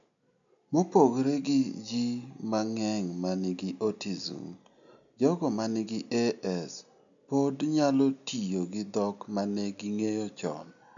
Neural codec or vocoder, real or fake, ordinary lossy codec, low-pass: none; real; none; 7.2 kHz